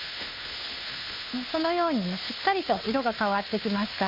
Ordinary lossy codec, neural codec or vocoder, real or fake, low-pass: MP3, 32 kbps; codec, 16 kHz, 2 kbps, FunCodec, trained on Chinese and English, 25 frames a second; fake; 5.4 kHz